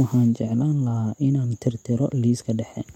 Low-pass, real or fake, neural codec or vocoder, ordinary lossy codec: 19.8 kHz; real; none; MP3, 64 kbps